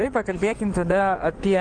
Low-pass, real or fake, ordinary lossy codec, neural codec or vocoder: 9.9 kHz; fake; Opus, 32 kbps; codec, 16 kHz in and 24 kHz out, 2.2 kbps, FireRedTTS-2 codec